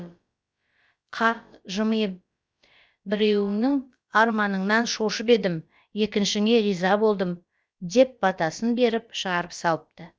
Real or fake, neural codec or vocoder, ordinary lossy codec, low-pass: fake; codec, 16 kHz, about 1 kbps, DyCAST, with the encoder's durations; none; none